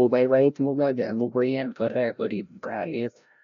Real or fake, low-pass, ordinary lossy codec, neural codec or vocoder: fake; 7.2 kHz; none; codec, 16 kHz, 0.5 kbps, FreqCodec, larger model